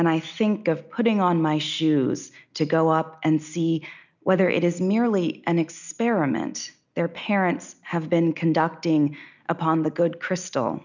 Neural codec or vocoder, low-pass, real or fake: none; 7.2 kHz; real